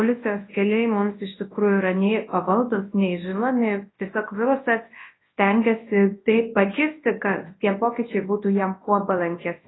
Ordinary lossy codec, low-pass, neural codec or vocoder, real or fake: AAC, 16 kbps; 7.2 kHz; codec, 24 kHz, 0.9 kbps, WavTokenizer, large speech release; fake